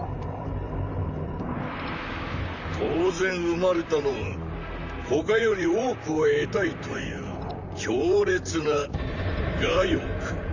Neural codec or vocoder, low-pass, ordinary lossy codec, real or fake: vocoder, 44.1 kHz, 128 mel bands, Pupu-Vocoder; 7.2 kHz; none; fake